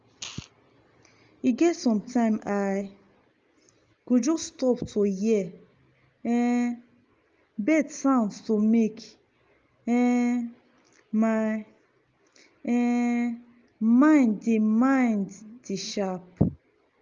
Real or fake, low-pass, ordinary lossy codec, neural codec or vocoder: real; 7.2 kHz; Opus, 32 kbps; none